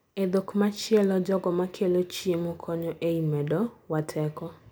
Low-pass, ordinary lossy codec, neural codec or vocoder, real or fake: none; none; none; real